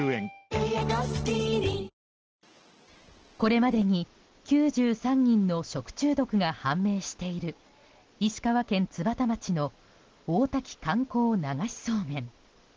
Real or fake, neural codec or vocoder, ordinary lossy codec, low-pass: real; none; Opus, 16 kbps; 7.2 kHz